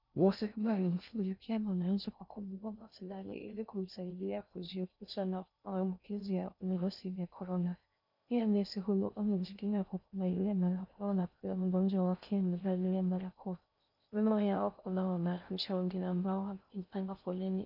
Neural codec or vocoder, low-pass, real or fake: codec, 16 kHz in and 24 kHz out, 0.6 kbps, FocalCodec, streaming, 2048 codes; 5.4 kHz; fake